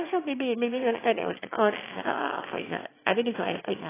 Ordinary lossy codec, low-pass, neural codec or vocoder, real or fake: AAC, 16 kbps; 3.6 kHz; autoencoder, 22.05 kHz, a latent of 192 numbers a frame, VITS, trained on one speaker; fake